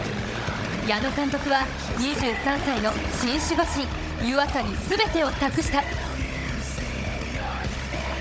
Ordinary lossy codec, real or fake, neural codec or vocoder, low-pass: none; fake; codec, 16 kHz, 16 kbps, FunCodec, trained on Chinese and English, 50 frames a second; none